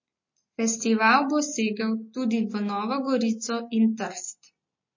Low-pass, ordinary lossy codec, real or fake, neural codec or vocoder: 7.2 kHz; MP3, 32 kbps; real; none